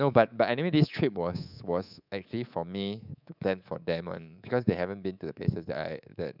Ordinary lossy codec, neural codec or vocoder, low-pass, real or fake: none; codec, 24 kHz, 3.1 kbps, DualCodec; 5.4 kHz; fake